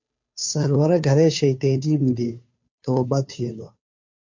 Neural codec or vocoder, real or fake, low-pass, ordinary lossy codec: codec, 16 kHz, 2 kbps, FunCodec, trained on Chinese and English, 25 frames a second; fake; 7.2 kHz; MP3, 48 kbps